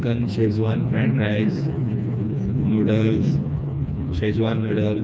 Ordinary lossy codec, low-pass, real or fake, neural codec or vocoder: none; none; fake; codec, 16 kHz, 2 kbps, FreqCodec, smaller model